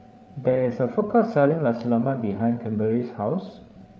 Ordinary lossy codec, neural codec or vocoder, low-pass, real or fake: none; codec, 16 kHz, 8 kbps, FreqCodec, larger model; none; fake